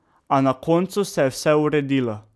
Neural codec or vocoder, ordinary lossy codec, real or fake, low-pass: none; none; real; none